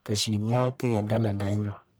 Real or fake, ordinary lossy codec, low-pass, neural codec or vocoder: fake; none; none; codec, 44.1 kHz, 1.7 kbps, Pupu-Codec